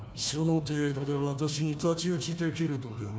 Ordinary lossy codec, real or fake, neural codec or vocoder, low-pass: none; fake; codec, 16 kHz, 1 kbps, FunCodec, trained on Chinese and English, 50 frames a second; none